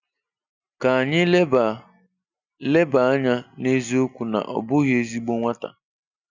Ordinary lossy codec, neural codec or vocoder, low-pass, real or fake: none; none; 7.2 kHz; real